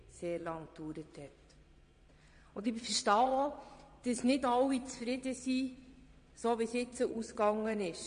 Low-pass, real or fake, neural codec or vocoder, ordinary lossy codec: 9.9 kHz; fake; vocoder, 22.05 kHz, 80 mel bands, Vocos; MP3, 48 kbps